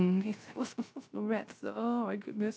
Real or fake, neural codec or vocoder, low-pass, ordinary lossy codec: fake; codec, 16 kHz, 0.3 kbps, FocalCodec; none; none